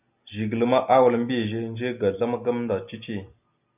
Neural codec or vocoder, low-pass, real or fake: none; 3.6 kHz; real